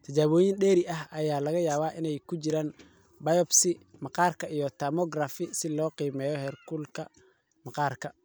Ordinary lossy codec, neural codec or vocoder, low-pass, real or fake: none; none; none; real